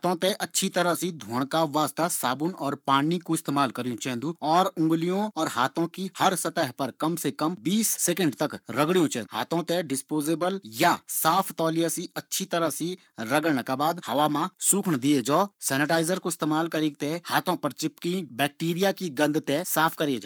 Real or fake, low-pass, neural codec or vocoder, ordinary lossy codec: fake; none; codec, 44.1 kHz, 7.8 kbps, Pupu-Codec; none